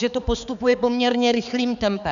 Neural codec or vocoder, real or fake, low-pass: codec, 16 kHz, 4 kbps, X-Codec, HuBERT features, trained on balanced general audio; fake; 7.2 kHz